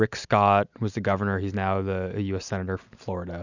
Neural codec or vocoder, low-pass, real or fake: none; 7.2 kHz; real